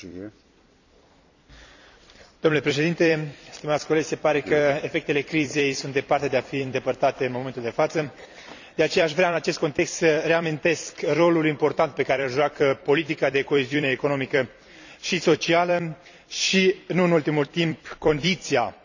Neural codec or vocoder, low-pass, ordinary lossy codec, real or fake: vocoder, 44.1 kHz, 128 mel bands every 256 samples, BigVGAN v2; 7.2 kHz; none; fake